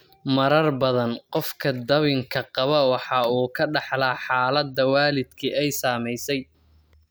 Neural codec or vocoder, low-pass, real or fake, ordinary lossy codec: none; none; real; none